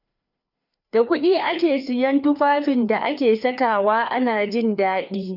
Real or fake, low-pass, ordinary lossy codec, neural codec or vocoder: fake; 5.4 kHz; none; codec, 16 kHz, 2 kbps, FreqCodec, larger model